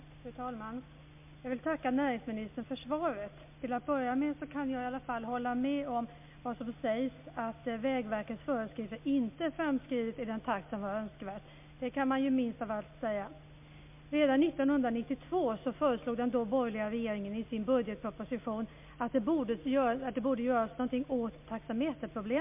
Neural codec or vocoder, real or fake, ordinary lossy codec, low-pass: none; real; MP3, 32 kbps; 3.6 kHz